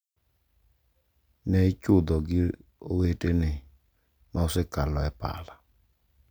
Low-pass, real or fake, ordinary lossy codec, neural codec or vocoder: none; real; none; none